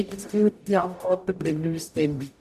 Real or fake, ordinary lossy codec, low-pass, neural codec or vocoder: fake; none; 14.4 kHz; codec, 44.1 kHz, 0.9 kbps, DAC